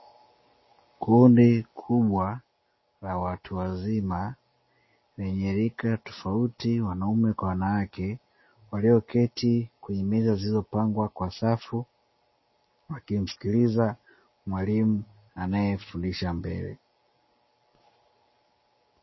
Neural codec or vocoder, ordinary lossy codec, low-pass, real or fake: none; MP3, 24 kbps; 7.2 kHz; real